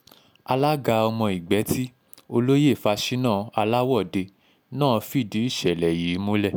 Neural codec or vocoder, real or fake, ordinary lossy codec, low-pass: none; real; none; none